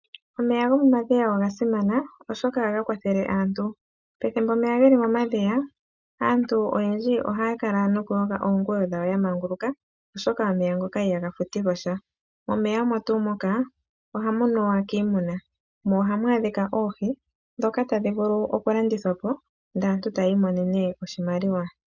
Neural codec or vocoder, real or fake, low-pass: none; real; 7.2 kHz